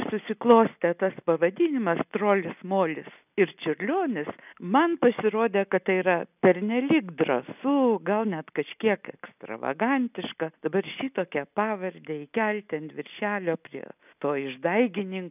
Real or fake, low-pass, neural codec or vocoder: real; 3.6 kHz; none